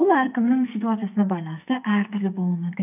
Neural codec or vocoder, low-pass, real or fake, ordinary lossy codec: codec, 44.1 kHz, 2.6 kbps, SNAC; 3.6 kHz; fake; none